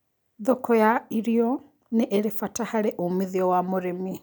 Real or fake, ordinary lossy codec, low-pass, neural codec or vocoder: real; none; none; none